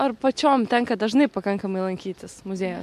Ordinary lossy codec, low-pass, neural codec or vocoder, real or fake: MP3, 64 kbps; 14.4 kHz; none; real